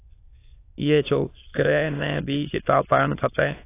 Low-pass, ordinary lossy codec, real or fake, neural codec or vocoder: 3.6 kHz; AAC, 24 kbps; fake; autoencoder, 22.05 kHz, a latent of 192 numbers a frame, VITS, trained on many speakers